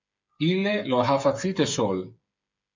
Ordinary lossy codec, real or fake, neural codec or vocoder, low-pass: MP3, 64 kbps; fake; codec, 16 kHz, 8 kbps, FreqCodec, smaller model; 7.2 kHz